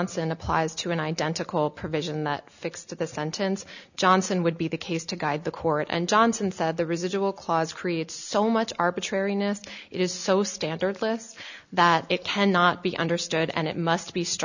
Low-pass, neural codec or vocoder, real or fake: 7.2 kHz; none; real